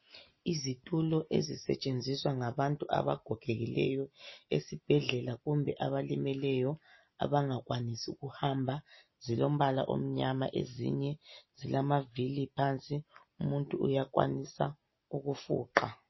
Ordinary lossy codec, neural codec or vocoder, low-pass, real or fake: MP3, 24 kbps; none; 7.2 kHz; real